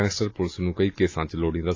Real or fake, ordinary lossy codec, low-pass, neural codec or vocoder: real; AAC, 32 kbps; 7.2 kHz; none